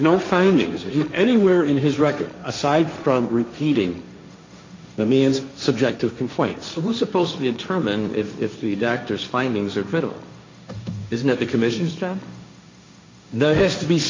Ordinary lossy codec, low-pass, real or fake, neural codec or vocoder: MP3, 48 kbps; 7.2 kHz; fake; codec, 16 kHz, 1.1 kbps, Voila-Tokenizer